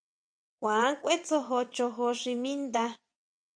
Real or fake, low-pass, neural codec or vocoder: fake; 9.9 kHz; vocoder, 22.05 kHz, 80 mel bands, WaveNeXt